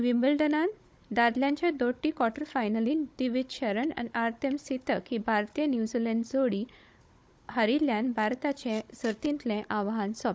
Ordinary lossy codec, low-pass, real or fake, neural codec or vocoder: none; none; fake; codec, 16 kHz, 16 kbps, FunCodec, trained on Chinese and English, 50 frames a second